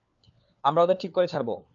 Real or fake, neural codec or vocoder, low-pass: fake; codec, 16 kHz, 4 kbps, FunCodec, trained on LibriTTS, 50 frames a second; 7.2 kHz